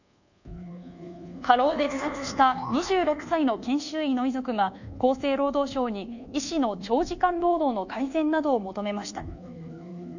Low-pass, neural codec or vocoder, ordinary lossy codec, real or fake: 7.2 kHz; codec, 24 kHz, 1.2 kbps, DualCodec; none; fake